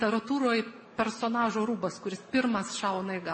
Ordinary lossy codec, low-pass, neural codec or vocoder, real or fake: MP3, 32 kbps; 10.8 kHz; none; real